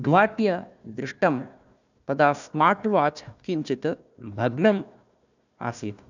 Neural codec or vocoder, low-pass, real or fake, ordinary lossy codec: codec, 16 kHz, 1 kbps, FunCodec, trained on Chinese and English, 50 frames a second; 7.2 kHz; fake; none